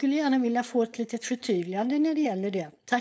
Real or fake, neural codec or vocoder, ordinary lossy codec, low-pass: fake; codec, 16 kHz, 4.8 kbps, FACodec; none; none